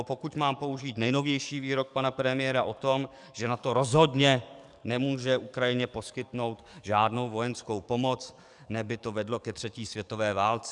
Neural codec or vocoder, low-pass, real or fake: codec, 44.1 kHz, 7.8 kbps, DAC; 10.8 kHz; fake